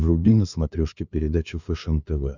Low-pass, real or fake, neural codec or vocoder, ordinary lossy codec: 7.2 kHz; fake; codec, 16 kHz, 2 kbps, FunCodec, trained on LibriTTS, 25 frames a second; Opus, 64 kbps